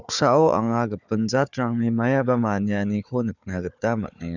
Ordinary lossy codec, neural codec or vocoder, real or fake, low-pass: none; codec, 16 kHz, 4 kbps, FunCodec, trained on Chinese and English, 50 frames a second; fake; 7.2 kHz